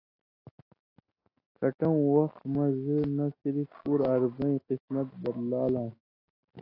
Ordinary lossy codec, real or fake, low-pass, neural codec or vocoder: AAC, 24 kbps; real; 5.4 kHz; none